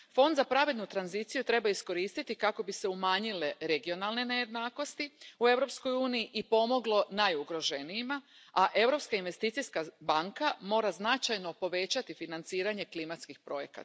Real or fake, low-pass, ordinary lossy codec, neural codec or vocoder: real; none; none; none